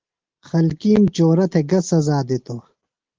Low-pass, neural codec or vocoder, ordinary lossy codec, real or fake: 7.2 kHz; none; Opus, 16 kbps; real